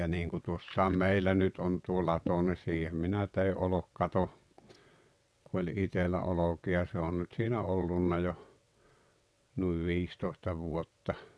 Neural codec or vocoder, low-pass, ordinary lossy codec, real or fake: vocoder, 22.05 kHz, 80 mel bands, WaveNeXt; none; none; fake